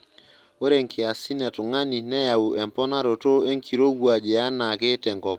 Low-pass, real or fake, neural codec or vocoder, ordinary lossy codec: 19.8 kHz; real; none; Opus, 24 kbps